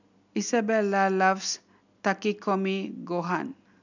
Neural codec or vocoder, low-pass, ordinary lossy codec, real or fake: none; 7.2 kHz; none; real